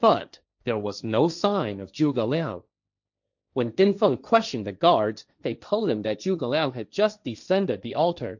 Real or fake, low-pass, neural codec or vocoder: fake; 7.2 kHz; codec, 16 kHz, 1.1 kbps, Voila-Tokenizer